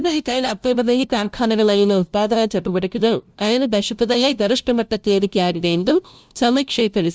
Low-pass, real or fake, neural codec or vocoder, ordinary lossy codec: none; fake; codec, 16 kHz, 0.5 kbps, FunCodec, trained on LibriTTS, 25 frames a second; none